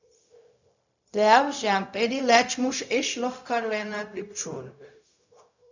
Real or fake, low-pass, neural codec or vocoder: fake; 7.2 kHz; codec, 16 kHz, 0.4 kbps, LongCat-Audio-Codec